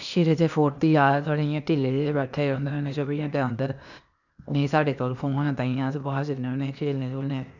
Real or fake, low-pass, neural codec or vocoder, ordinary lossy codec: fake; 7.2 kHz; codec, 16 kHz, 0.8 kbps, ZipCodec; none